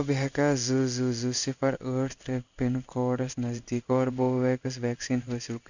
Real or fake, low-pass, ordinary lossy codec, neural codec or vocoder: fake; 7.2 kHz; none; codec, 16 kHz in and 24 kHz out, 1 kbps, XY-Tokenizer